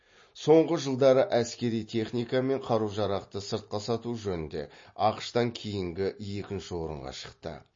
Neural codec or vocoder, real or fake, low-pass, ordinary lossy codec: none; real; 7.2 kHz; MP3, 32 kbps